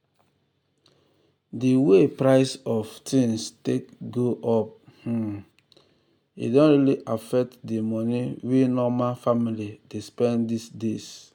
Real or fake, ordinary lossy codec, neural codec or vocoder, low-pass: real; none; none; 19.8 kHz